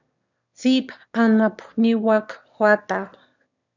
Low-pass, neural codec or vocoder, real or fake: 7.2 kHz; autoencoder, 22.05 kHz, a latent of 192 numbers a frame, VITS, trained on one speaker; fake